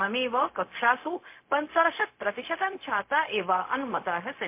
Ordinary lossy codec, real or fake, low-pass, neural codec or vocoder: MP3, 24 kbps; fake; 3.6 kHz; codec, 16 kHz, 0.4 kbps, LongCat-Audio-Codec